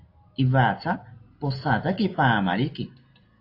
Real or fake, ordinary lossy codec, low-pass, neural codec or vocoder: real; AAC, 32 kbps; 5.4 kHz; none